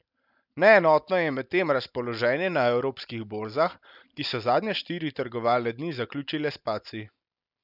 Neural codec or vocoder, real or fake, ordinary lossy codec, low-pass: none; real; none; 5.4 kHz